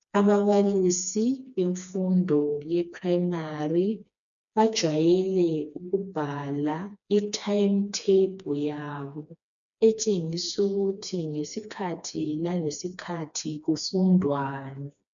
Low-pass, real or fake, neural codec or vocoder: 7.2 kHz; fake; codec, 16 kHz, 2 kbps, FreqCodec, smaller model